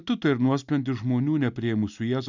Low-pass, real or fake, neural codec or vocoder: 7.2 kHz; real; none